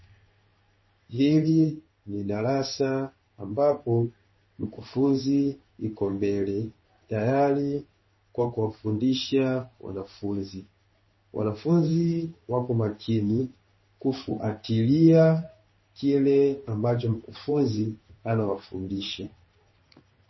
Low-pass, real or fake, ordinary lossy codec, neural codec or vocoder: 7.2 kHz; fake; MP3, 24 kbps; codec, 16 kHz in and 24 kHz out, 1 kbps, XY-Tokenizer